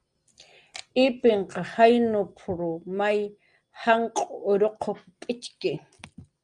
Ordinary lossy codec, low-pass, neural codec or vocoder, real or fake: Opus, 32 kbps; 9.9 kHz; none; real